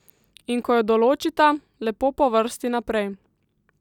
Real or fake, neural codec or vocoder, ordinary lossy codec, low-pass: real; none; none; 19.8 kHz